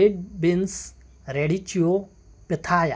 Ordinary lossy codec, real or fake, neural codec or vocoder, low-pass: none; real; none; none